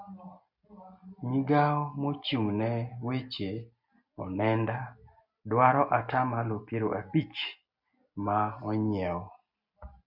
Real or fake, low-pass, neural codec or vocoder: real; 5.4 kHz; none